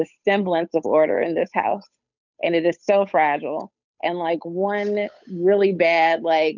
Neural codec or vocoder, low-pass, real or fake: codec, 16 kHz, 8 kbps, FunCodec, trained on Chinese and English, 25 frames a second; 7.2 kHz; fake